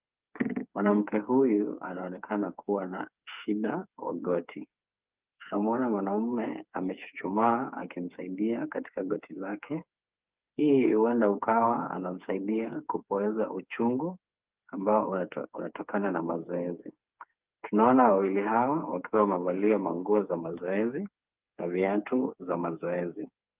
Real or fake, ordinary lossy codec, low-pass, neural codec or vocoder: fake; Opus, 24 kbps; 3.6 kHz; codec, 16 kHz, 4 kbps, FreqCodec, smaller model